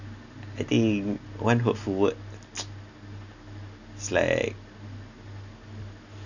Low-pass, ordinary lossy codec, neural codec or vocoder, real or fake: 7.2 kHz; none; none; real